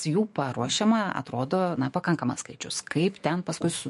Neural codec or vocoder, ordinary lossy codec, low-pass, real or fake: autoencoder, 48 kHz, 128 numbers a frame, DAC-VAE, trained on Japanese speech; MP3, 48 kbps; 14.4 kHz; fake